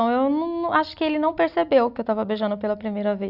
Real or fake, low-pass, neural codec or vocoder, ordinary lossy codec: real; 5.4 kHz; none; none